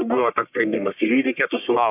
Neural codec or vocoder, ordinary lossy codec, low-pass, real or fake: codec, 44.1 kHz, 1.7 kbps, Pupu-Codec; AAC, 32 kbps; 3.6 kHz; fake